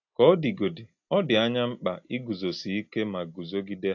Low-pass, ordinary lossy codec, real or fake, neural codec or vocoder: 7.2 kHz; AAC, 48 kbps; real; none